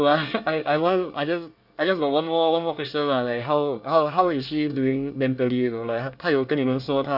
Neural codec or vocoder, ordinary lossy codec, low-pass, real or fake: codec, 24 kHz, 1 kbps, SNAC; none; 5.4 kHz; fake